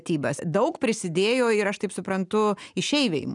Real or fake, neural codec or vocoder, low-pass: real; none; 10.8 kHz